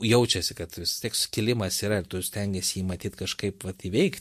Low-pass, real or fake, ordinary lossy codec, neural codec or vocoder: 14.4 kHz; real; MP3, 64 kbps; none